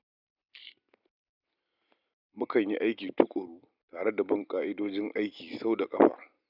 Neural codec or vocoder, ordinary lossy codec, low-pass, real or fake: none; none; 5.4 kHz; real